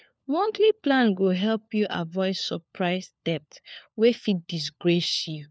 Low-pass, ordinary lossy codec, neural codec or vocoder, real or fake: none; none; codec, 16 kHz, 4 kbps, FunCodec, trained on LibriTTS, 50 frames a second; fake